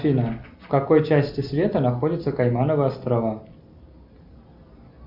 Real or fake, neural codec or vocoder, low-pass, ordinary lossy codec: real; none; 5.4 kHz; AAC, 48 kbps